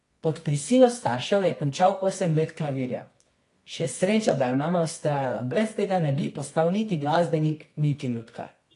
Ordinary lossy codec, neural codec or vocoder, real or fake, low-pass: AAC, 48 kbps; codec, 24 kHz, 0.9 kbps, WavTokenizer, medium music audio release; fake; 10.8 kHz